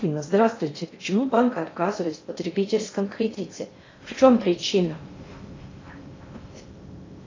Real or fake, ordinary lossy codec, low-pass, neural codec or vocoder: fake; AAC, 32 kbps; 7.2 kHz; codec, 16 kHz in and 24 kHz out, 0.6 kbps, FocalCodec, streaming, 2048 codes